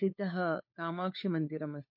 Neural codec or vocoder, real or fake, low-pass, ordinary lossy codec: codec, 16 kHz, 4 kbps, FunCodec, trained on LibriTTS, 50 frames a second; fake; 5.4 kHz; MP3, 32 kbps